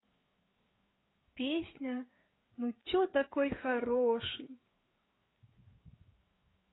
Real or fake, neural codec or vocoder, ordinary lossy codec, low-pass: fake; codec, 16 kHz, 4 kbps, FreqCodec, larger model; AAC, 16 kbps; 7.2 kHz